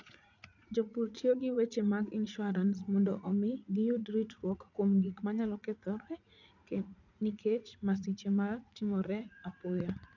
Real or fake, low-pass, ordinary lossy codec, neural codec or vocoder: fake; 7.2 kHz; none; vocoder, 44.1 kHz, 80 mel bands, Vocos